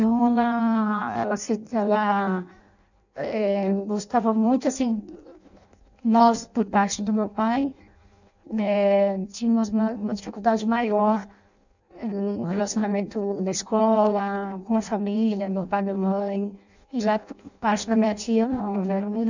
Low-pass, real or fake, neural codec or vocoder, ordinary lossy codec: 7.2 kHz; fake; codec, 16 kHz in and 24 kHz out, 0.6 kbps, FireRedTTS-2 codec; none